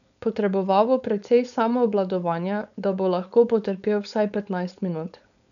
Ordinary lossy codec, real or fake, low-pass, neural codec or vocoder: none; fake; 7.2 kHz; codec, 16 kHz, 4.8 kbps, FACodec